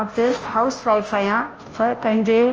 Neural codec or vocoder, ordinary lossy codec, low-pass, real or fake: codec, 16 kHz, 0.5 kbps, FunCodec, trained on Chinese and English, 25 frames a second; Opus, 24 kbps; 7.2 kHz; fake